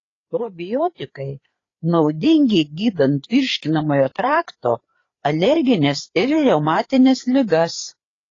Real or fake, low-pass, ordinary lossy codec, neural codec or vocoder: fake; 7.2 kHz; AAC, 32 kbps; codec, 16 kHz, 4 kbps, FreqCodec, larger model